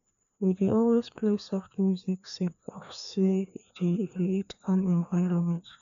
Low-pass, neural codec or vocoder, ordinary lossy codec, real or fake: 7.2 kHz; codec, 16 kHz, 2 kbps, FreqCodec, larger model; none; fake